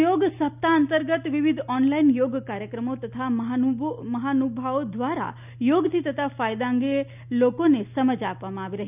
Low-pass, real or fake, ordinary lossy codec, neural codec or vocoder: 3.6 kHz; real; none; none